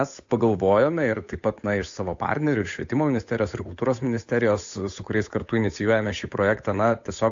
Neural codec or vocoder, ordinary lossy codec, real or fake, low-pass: codec, 16 kHz, 8 kbps, FunCodec, trained on Chinese and English, 25 frames a second; AAC, 64 kbps; fake; 7.2 kHz